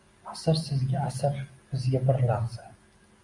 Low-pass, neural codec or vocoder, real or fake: 10.8 kHz; none; real